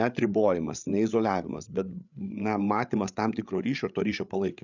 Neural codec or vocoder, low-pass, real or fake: codec, 16 kHz, 8 kbps, FreqCodec, larger model; 7.2 kHz; fake